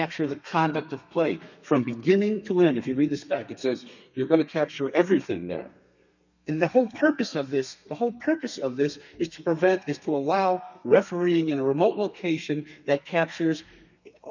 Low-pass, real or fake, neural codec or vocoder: 7.2 kHz; fake; codec, 32 kHz, 1.9 kbps, SNAC